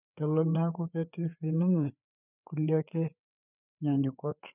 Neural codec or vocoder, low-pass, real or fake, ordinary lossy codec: codec, 16 kHz, 4 kbps, FreqCodec, larger model; 3.6 kHz; fake; none